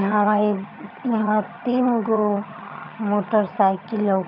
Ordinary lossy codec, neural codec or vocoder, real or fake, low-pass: none; vocoder, 22.05 kHz, 80 mel bands, HiFi-GAN; fake; 5.4 kHz